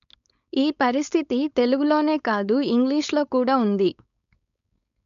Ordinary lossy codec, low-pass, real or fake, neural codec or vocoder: MP3, 96 kbps; 7.2 kHz; fake; codec, 16 kHz, 4.8 kbps, FACodec